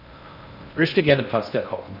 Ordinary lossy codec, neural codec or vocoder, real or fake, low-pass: none; codec, 16 kHz in and 24 kHz out, 0.6 kbps, FocalCodec, streaming, 4096 codes; fake; 5.4 kHz